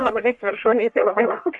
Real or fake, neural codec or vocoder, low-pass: fake; codec, 44.1 kHz, 1.7 kbps, Pupu-Codec; 10.8 kHz